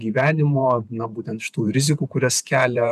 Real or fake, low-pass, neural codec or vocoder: fake; 14.4 kHz; vocoder, 48 kHz, 128 mel bands, Vocos